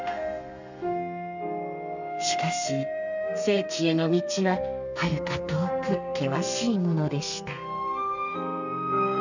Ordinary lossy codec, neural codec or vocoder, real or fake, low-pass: none; codec, 32 kHz, 1.9 kbps, SNAC; fake; 7.2 kHz